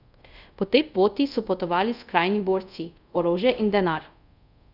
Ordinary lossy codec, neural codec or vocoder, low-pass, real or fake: none; codec, 24 kHz, 0.5 kbps, DualCodec; 5.4 kHz; fake